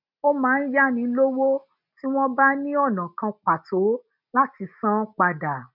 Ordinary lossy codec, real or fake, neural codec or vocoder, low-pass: none; real; none; 5.4 kHz